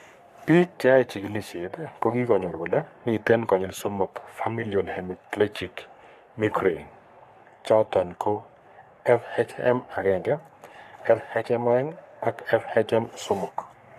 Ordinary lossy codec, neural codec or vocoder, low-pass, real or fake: none; codec, 44.1 kHz, 3.4 kbps, Pupu-Codec; 14.4 kHz; fake